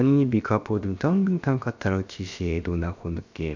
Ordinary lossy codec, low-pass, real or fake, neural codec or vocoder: none; 7.2 kHz; fake; codec, 16 kHz, about 1 kbps, DyCAST, with the encoder's durations